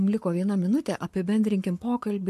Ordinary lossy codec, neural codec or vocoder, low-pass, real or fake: MP3, 64 kbps; codec, 44.1 kHz, 7.8 kbps, Pupu-Codec; 14.4 kHz; fake